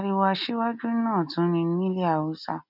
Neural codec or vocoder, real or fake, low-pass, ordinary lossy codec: none; real; 5.4 kHz; none